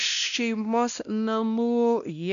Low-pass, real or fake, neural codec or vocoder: 7.2 kHz; fake; codec, 16 kHz, 1 kbps, X-Codec, WavLM features, trained on Multilingual LibriSpeech